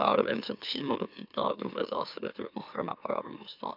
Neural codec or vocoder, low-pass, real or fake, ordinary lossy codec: autoencoder, 44.1 kHz, a latent of 192 numbers a frame, MeloTTS; 5.4 kHz; fake; none